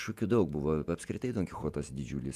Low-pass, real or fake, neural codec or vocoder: 14.4 kHz; fake; vocoder, 48 kHz, 128 mel bands, Vocos